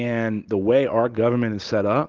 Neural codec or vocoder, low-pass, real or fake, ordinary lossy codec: none; 7.2 kHz; real; Opus, 16 kbps